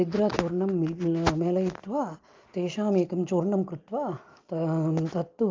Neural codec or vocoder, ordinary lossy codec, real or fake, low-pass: none; Opus, 24 kbps; real; 7.2 kHz